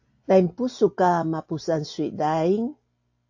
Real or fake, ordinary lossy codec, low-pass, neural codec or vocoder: real; AAC, 48 kbps; 7.2 kHz; none